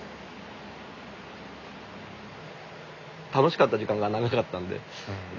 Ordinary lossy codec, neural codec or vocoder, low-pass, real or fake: none; none; 7.2 kHz; real